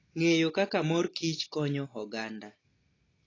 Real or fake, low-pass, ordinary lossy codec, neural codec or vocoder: real; 7.2 kHz; AAC, 32 kbps; none